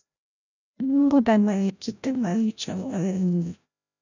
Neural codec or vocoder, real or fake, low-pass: codec, 16 kHz, 0.5 kbps, FreqCodec, larger model; fake; 7.2 kHz